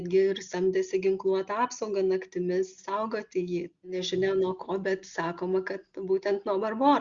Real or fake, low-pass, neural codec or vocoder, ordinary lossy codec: real; 7.2 kHz; none; Opus, 64 kbps